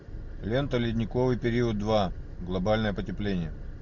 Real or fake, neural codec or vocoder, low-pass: real; none; 7.2 kHz